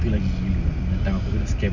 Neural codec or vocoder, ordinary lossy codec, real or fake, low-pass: none; none; real; 7.2 kHz